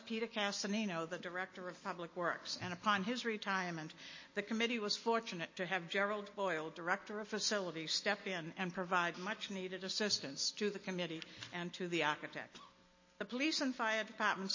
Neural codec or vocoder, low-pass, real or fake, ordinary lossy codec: none; 7.2 kHz; real; MP3, 32 kbps